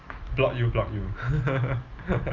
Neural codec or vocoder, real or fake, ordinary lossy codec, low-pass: none; real; Opus, 24 kbps; 7.2 kHz